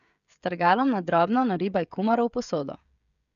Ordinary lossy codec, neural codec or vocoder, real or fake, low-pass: none; codec, 16 kHz, 16 kbps, FreqCodec, smaller model; fake; 7.2 kHz